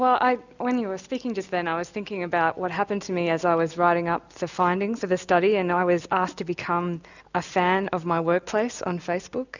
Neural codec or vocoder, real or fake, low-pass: none; real; 7.2 kHz